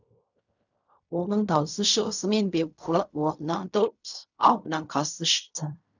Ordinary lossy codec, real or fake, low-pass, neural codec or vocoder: none; fake; 7.2 kHz; codec, 16 kHz in and 24 kHz out, 0.4 kbps, LongCat-Audio-Codec, fine tuned four codebook decoder